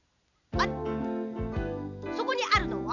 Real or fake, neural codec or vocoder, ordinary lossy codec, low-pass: real; none; none; 7.2 kHz